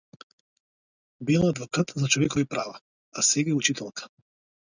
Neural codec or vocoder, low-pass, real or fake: none; 7.2 kHz; real